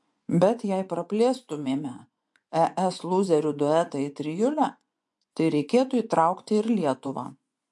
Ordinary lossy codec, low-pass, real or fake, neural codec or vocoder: MP3, 64 kbps; 10.8 kHz; fake; vocoder, 24 kHz, 100 mel bands, Vocos